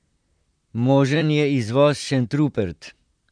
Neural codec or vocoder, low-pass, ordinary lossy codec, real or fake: vocoder, 44.1 kHz, 128 mel bands, Pupu-Vocoder; 9.9 kHz; none; fake